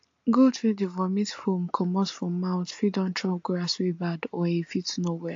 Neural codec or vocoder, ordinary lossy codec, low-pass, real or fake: none; AAC, 48 kbps; 7.2 kHz; real